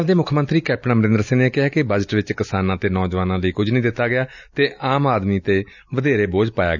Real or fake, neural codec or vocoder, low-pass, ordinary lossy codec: real; none; 7.2 kHz; none